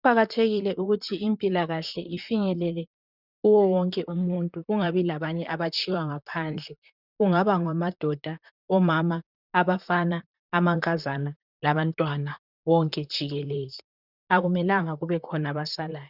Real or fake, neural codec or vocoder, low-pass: fake; vocoder, 44.1 kHz, 128 mel bands, Pupu-Vocoder; 5.4 kHz